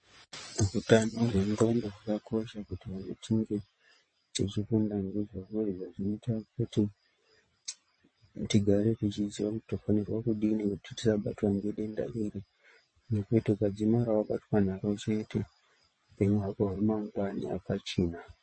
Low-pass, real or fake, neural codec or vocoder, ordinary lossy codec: 9.9 kHz; fake; vocoder, 22.05 kHz, 80 mel bands, WaveNeXt; MP3, 32 kbps